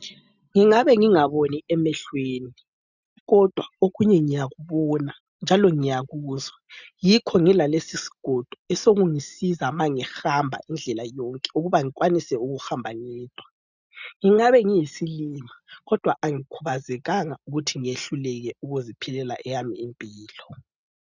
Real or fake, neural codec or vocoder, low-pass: real; none; 7.2 kHz